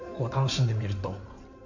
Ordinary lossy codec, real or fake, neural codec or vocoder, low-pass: none; fake; codec, 16 kHz in and 24 kHz out, 2.2 kbps, FireRedTTS-2 codec; 7.2 kHz